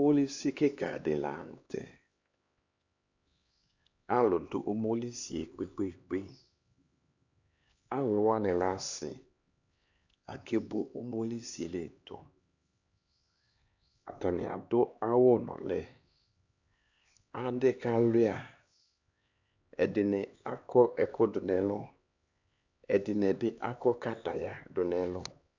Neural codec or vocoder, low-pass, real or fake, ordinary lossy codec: codec, 16 kHz, 2 kbps, X-Codec, HuBERT features, trained on LibriSpeech; 7.2 kHz; fake; Opus, 64 kbps